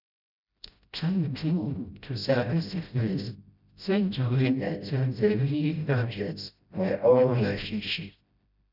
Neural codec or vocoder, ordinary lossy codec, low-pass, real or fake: codec, 16 kHz, 0.5 kbps, FreqCodec, smaller model; none; 5.4 kHz; fake